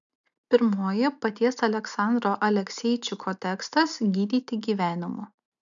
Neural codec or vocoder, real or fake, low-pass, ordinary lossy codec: none; real; 7.2 kHz; MP3, 96 kbps